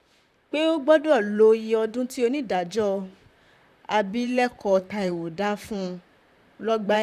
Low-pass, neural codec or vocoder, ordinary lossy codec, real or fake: 14.4 kHz; vocoder, 44.1 kHz, 128 mel bands, Pupu-Vocoder; none; fake